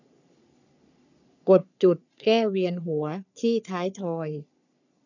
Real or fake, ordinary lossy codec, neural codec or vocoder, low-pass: fake; none; codec, 44.1 kHz, 3.4 kbps, Pupu-Codec; 7.2 kHz